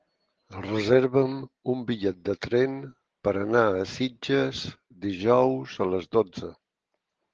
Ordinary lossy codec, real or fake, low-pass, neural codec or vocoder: Opus, 16 kbps; real; 7.2 kHz; none